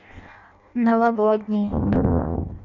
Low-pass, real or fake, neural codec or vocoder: 7.2 kHz; fake; codec, 16 kHz in and 24 kHz out, 0.6 kbps, FireRedTTS-2 codec